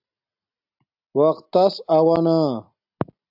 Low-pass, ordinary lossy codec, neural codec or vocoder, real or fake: 5.4 kHz; AAC, 48 kbps; none; real